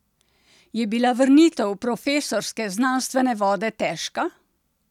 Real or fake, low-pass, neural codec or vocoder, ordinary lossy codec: real; 19.8 kHz; none; none